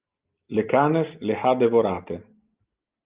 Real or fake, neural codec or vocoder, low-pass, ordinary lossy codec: real; none; 3.6 kHz; Opus, 24 kbps